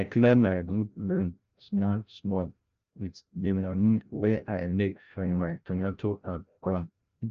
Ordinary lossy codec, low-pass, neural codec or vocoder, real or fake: Opus, 24 kbps; 7.2 kHz; codec, 16 kHz, 0.5 kbps, FreqCodec, larger model; fake